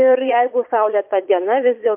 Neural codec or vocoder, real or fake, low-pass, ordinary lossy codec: vocoder, 22.05 kHz, 80 mel bands, Vocos; fake; 3.6 kHz; MP3, 32 kbps